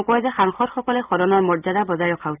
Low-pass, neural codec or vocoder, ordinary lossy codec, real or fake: 3.6 kHz; none; Opus, 32 kbps; real